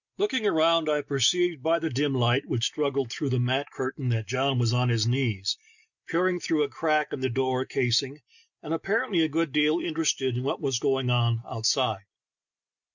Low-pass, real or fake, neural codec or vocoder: 7.2 kHz; real; none